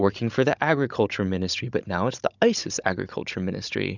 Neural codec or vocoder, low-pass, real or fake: codec, 16 kHz, 16 kbps, FunCodec, trained on Chinese and English, 50 frames a second; 7.2 kHz; fake